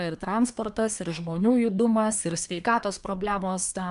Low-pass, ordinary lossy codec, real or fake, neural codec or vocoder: 10.8 kHz; MP3, 96 kbps; fake; codec, 24 kHz, 1 kbps, SNAC